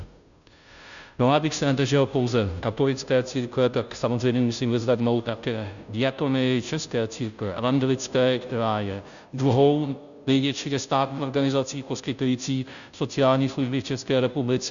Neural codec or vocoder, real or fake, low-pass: codec, 16 kHz, 0.5 kbps, FunCodec, trained on Chinese and English, 25 frames a second; fake; 7.2 kHz